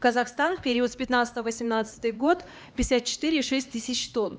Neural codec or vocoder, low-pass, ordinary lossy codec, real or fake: codec, 16 kHz, 2 kbps, X-Codec, HuBERT features, trained on LibriSpeech; none; none; fake